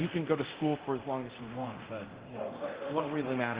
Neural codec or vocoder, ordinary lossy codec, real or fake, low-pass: codec, 24 kHz, 0.9 kbps, DualCodec; Opus, 16 kbps; fake; 3.6 kHz